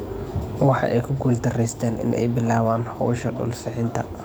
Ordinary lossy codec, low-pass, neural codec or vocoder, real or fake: none; none; codec, 44.1 kHz, 7.8 kbps, DAC; fake